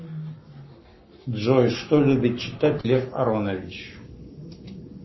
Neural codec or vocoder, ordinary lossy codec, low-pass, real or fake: codec, 44.1 kHz, 7.8 kbps, Pupu-Codec; MP3, 24 kbps; 7.2 kHz; fake